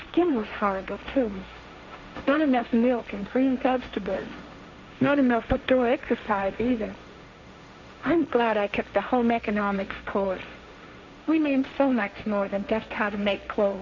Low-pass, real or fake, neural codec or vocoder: 7.2 kHz; fake; codec, 16 kHz, 1.1 kbps, Voila-Tokenizer